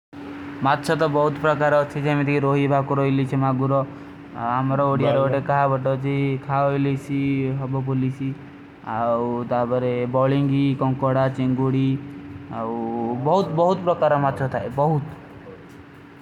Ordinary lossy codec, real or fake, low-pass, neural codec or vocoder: none; real; 19.8 kHz; none